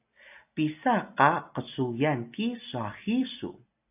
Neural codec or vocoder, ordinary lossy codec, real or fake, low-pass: none; AAC, 32 kbps; real; 3.6 kHz